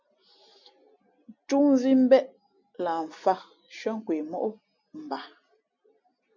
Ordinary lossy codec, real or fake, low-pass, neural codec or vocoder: MP3, 64 kbps; real; 7.2 kHz; none